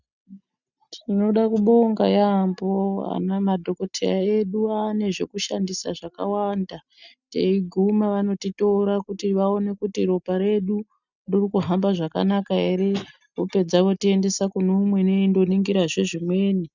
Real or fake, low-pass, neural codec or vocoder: real; 7.2 kHz; none